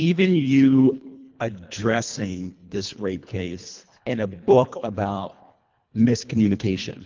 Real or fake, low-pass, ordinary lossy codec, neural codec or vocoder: fake; 7.2 kHz; Opus, 32 kbps; codec, 24 kHz, 1.5 kbps, HILCodec